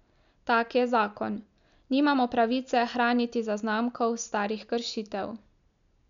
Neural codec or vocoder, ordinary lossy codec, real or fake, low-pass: none; none; real; 7.2 kHz